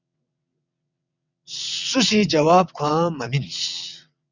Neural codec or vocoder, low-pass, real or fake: vocoder, 22.05 kHz, 80 mel bands, WaveNeXt; 7.2 kHz; fake